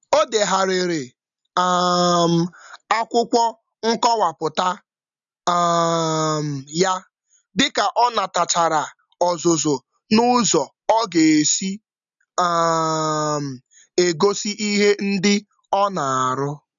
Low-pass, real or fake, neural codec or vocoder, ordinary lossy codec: 7.2 kHz; real; none; none